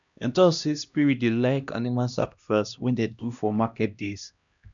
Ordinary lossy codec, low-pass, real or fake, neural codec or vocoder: none; 7.2 kHz; fake; codec, 16 kHz, 1 kbps, X-Codec, HuBERT features, trained on LibriSpeech